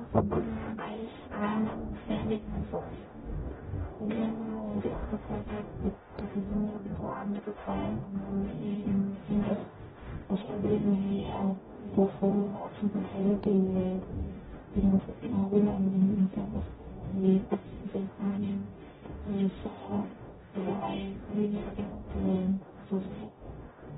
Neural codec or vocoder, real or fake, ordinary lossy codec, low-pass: codec, 44.1 kHz, 0.9 kbps, DAC; fake; AAC, 16 kbps; 19.8 kHz